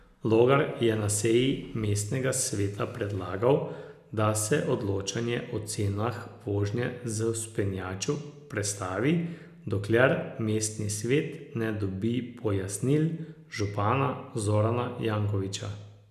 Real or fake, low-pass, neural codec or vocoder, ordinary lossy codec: real; 14.4 kHz; none; none